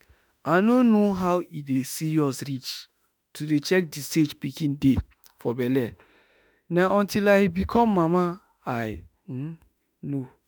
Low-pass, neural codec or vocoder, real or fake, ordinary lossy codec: none; autoencoder, 48 kHz, 32 numbers a frame, DAC-VAE, trained on Japanese speech; fake; none